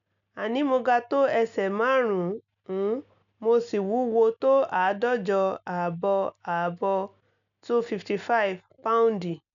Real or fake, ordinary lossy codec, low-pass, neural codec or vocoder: real; none; 7.2 kHz; none